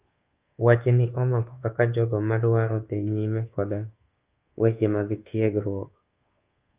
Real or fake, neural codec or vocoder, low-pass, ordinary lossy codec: fake; codec, 24 kHz, 1.2 kbps, DualCodec; 3.6 kHz; Opus, 24 kbps